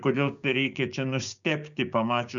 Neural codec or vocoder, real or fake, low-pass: codec, 16 kHz, 6 kbps, DAC; fake; 7.2 kHz